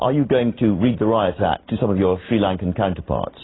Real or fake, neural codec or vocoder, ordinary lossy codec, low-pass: real; none; AAC, 16 kbps; 7.2 kHz